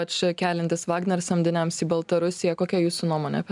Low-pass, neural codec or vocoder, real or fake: 10.8 kHz; none; real